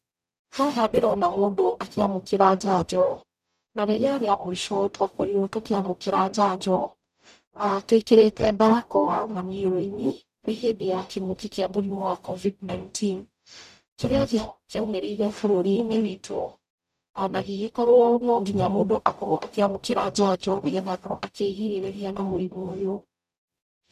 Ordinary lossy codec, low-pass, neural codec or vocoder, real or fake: none; 14.4 kHz; codec, 44.1 kHz, 0.9 kbps, DAC; fake